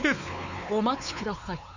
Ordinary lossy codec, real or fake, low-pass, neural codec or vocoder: none; fake; 7.2 kHz; codec, 16 kHz, 4 kbps, X-Codec, WavLM features, trained on Multilingual LibriSpeech